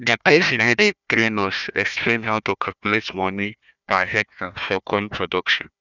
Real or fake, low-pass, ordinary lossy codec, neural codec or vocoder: fake; 7.2 kHz; none; codec, 16 kHz, 1 kbps, FunCodec, trained on Chinese and English, 50 frames a second